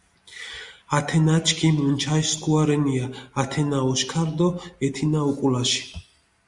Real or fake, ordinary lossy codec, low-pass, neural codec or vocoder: real; Opus, 64 kbps; 10.8 kHz; none